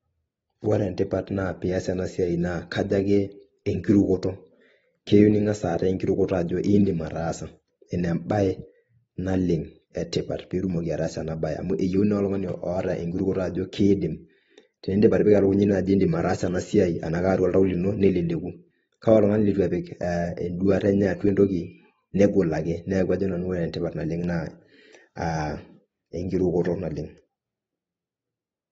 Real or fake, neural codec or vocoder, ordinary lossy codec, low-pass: real; none; AAC, 24 kbps; 19.8 kHz